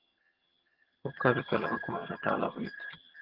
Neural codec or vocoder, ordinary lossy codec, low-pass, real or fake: vocoder, 22.05 kHz, 80 mel bands, HiFi-GAN; Opus, 16 kbps; 5.4 kHz; fake